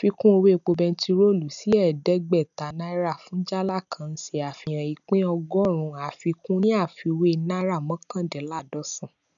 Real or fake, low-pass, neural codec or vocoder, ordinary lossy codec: real; 7.2 kHz; none; none